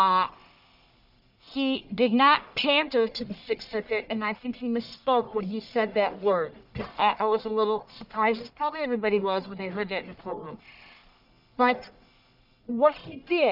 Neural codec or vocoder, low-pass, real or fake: codec, 44.1 kHz, 1.7 kbps, Pupu-Codec; 5.4 kHz; fake